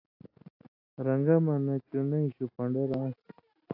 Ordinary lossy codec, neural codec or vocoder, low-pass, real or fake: AAC, 32 kbps; none; 5.4 kHz; real